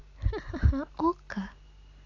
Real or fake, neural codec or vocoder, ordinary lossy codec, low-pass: real; none; AAC, 32 kbps; 7.2 kHz